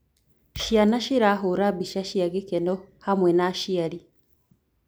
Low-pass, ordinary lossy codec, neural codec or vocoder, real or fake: none; none; none; real